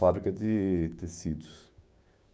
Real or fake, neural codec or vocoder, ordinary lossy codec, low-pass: fake; codec, 16 kHz, 6 kbps, DAC; none; none